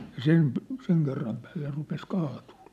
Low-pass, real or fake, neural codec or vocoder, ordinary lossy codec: 14.4 kHz; real; none; none